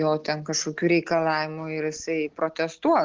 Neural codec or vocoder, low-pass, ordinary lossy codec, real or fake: none; 7.2 kHz; Opus, 16 kbps; real